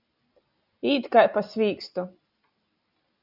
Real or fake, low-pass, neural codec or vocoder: real; 5.4 kHz; none